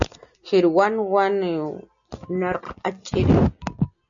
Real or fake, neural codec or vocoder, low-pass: real; none; 7.2 kHz